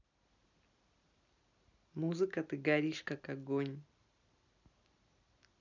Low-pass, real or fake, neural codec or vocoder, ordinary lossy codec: 7.2 kHz; real; none; none